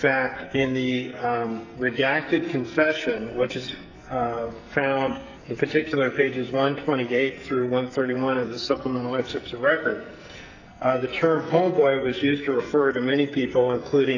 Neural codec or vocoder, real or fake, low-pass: codec, 44.1 kHz, 2.6 kbps, SNAC; fake; 7.2 kHz